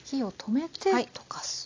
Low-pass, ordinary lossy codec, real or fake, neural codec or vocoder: 7.2 kHz; AAC, 48 kbps; real; none